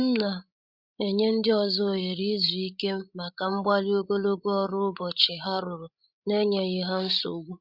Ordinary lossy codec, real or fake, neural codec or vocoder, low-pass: Opus, 64 kbps; fake; codec, 16 kHz, 16 kbps, FreqCodec, larger model; 5.4 kHz